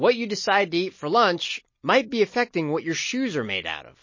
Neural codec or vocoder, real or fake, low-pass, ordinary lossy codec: none; real; 7.2 kHz; MP3, 32 kbps